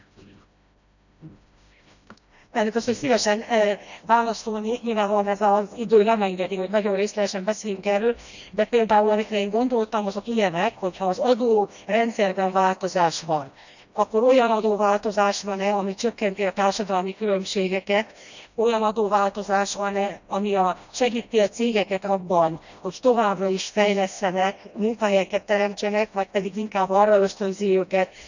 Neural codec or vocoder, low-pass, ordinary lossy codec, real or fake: codec, 16 kHz, 1 kbps, FreqCodec, smaller model; 7.2 kHz; none; fake